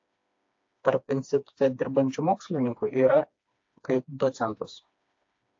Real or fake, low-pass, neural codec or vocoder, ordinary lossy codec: fake; 7.2 kHz; codec, 16 kHz, 2 kbps, FreqCodec, smaller model; AAC, 48 kbps